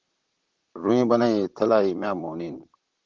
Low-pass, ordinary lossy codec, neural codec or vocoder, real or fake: 7.2 kHz; Opus, 16 kbps; vocoder, 44.1 kHz, 128 mel bands, Pupu-Vocoder; fake